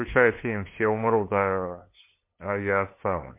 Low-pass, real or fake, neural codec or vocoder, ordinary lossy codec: 3.6 kHz; fake; codec, 16 kHz, 2 kbps, FunCodec, trained on LibriTTS, 25 frames a second; MP3, 24 kbps